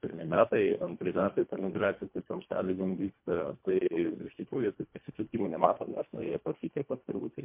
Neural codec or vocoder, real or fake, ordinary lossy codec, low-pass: codec, 24 kHz, 1.5 kbps, HILCodec; fake; MP3, 32 kbps; 3.6 kHz